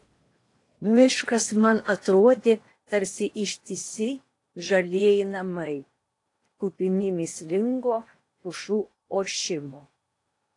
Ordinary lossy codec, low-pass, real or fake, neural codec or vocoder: AAC, 48 kbps; 10.8 kHz; fake; codec, 16 kHz in and 24 kHz out, 0.8 kbps, FocalCodec, streaming, 65536 codes